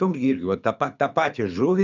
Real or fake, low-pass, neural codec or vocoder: fake; 7.2 kHz; codec, 24 kHz, 6 kbps, HILCodec